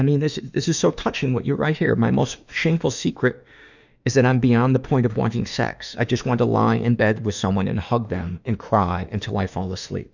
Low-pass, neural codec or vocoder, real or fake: 7.2 kHz; autoencoder, 48 kHz, 32 numbers a frame, DAC-VAE, trained on Japanese speech; fake